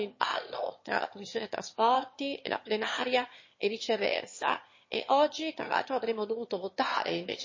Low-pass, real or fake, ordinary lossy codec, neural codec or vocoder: 7.2 kHz; fake; MP3, 32 kbps; autoencoder, 22.05 kHz, a latent of 192 numbers a frame, VITS, trained on one speaker